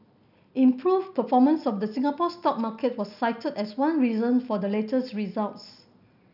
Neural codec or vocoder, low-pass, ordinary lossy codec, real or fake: none; 5.4 kHz; none; real